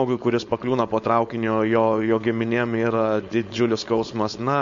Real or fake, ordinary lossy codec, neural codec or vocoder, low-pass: fake; MP3, 48 kbps; codec, 16 kHz, 4.8 kbps, FACodec; 7.2 kHz